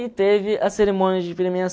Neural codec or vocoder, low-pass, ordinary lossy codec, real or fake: none; none; none; real